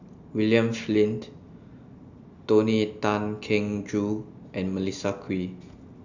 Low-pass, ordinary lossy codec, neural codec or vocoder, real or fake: 7.2 kHz; none; none; real